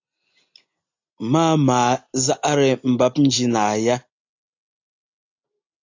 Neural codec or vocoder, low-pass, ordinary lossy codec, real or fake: none; 7.2 kHz; AAC, 48 kbps; real